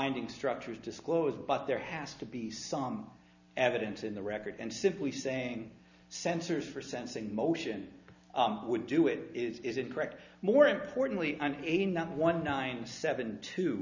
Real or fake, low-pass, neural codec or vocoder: real; 7.2 kHz; none